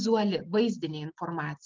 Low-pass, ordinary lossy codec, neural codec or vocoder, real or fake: 7.2 kHz; Opus, 24 kbps; none; real